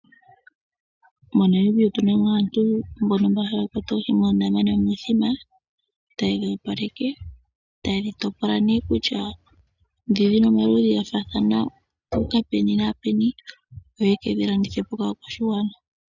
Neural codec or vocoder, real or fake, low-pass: none; real; 7.2 kHz